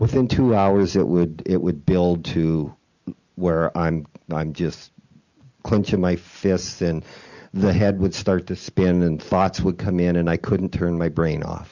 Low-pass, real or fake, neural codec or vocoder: 7.2 kHz; real; none